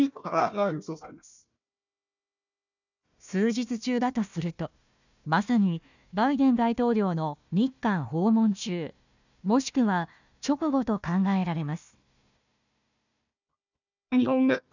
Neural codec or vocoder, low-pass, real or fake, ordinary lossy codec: codec, 16 kHz, 1 kbps, FunCodec, trained on Chinese and English, 50 frames a second; 7.2 kHz; fake; none